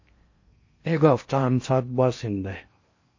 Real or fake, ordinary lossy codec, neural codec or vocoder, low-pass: fake; MP3, 32 kbps; codec, 16 kHz in and 24 kHz out, 0.6 kbps, FocalCodec, streaming, 4096 codes; 7.2 kHz